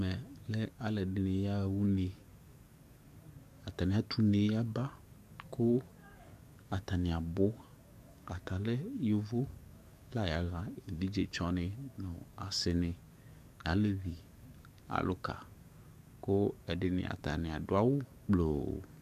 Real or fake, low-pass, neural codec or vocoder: fake; 14.4 kHz; codec, 44.1 kHz, 7.8 kbps, DAC